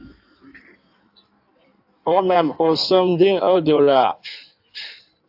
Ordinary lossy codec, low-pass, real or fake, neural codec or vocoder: AAC, 48 kbps; 5.4 kHz; fake; codec, 16 kHz in and 24 kHz out, 1.1 kbps, FireRedTTS-2 codec